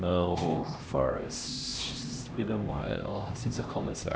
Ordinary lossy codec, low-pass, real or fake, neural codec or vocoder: none; none; fake; codec, 16 kHz, 1 kbps, X-Codec, HuBERT features, trained on LibriSpeech